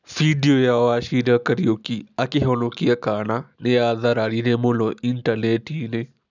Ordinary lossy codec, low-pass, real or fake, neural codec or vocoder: none; 7.2 kHz; real; none